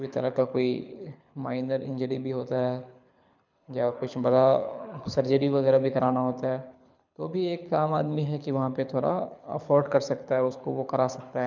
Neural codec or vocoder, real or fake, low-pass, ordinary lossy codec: codec, 24 kHz, 6 kbps, HILCodec; fake; 7.2 kHz; none